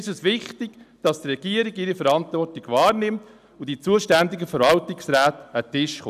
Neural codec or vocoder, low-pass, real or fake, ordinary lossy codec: none; 14.4 kHz; real; none